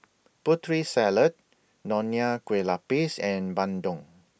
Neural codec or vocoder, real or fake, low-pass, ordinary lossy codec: none; real; none; none